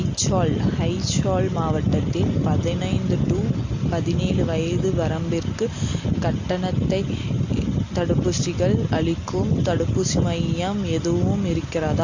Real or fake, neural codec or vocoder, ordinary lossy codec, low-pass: real; none; AAC, 48 kbps; 7.2 kHz